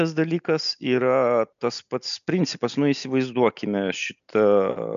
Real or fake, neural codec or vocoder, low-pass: real; none; 7.2 kHz